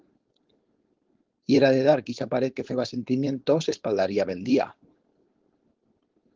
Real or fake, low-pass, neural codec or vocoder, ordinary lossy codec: fake; 7.2 kHz; codec, 16 kHz, 4.8 kbps, FACodec; Opus, 16 kbps